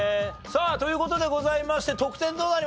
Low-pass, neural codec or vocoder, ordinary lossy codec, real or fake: none; none; none; real